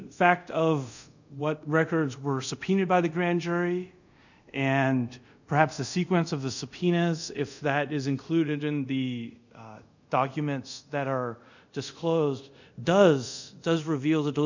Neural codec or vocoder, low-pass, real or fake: codec, 24 kHz, 0.5 kbps, DualCodec; 7.2 kHz; fake